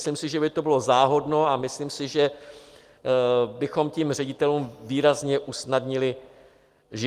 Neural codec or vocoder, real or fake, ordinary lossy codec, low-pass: none; real; Opus, 32 kbps; 14.4 kHz